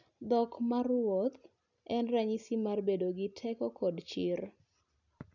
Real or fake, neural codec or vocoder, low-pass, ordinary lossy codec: real; none; 7.2 kHz; none